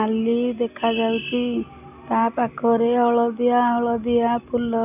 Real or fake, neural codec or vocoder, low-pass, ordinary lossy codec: real; none; 3.6 kHz; none